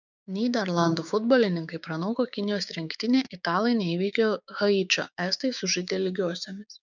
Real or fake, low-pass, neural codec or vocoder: fake; 7.2 kHz; codec, 16 kHz, 6 kbps, DAC